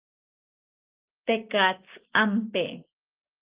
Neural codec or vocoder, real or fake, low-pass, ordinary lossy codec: none; real; 3.6 kHz; Opus, 24 kbps